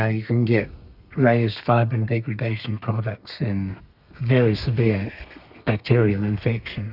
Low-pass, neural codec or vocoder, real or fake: 5.4 kHz; codec, 32 kHz, 1.9 kbps, SNAC; fake